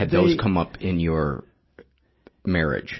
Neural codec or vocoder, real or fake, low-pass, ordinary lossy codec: none; real; 7.2 kHz; MP3, 24 kbps